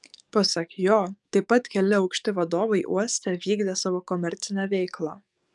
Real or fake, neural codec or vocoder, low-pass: fake; codec, 44.1 kHz, 7.8 kbps, DAC; 10.8 kHz